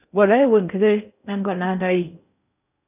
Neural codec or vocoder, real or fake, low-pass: codec, 16 kHz in and 24 kHz out, 0.6 kbps, FocalCodec, streaming, 4096 codes; fake; 3.6 kHz